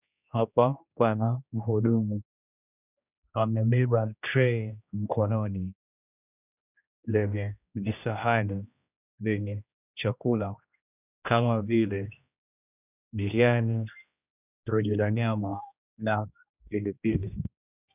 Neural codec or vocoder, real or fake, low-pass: codec, 16 kHz, 1 kbps, X-Codec, HuBERT features, trained on general audio; fake; 3.6 kHz